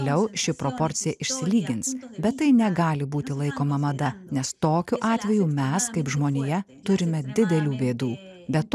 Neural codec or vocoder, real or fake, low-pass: none; real; 14.4 kHz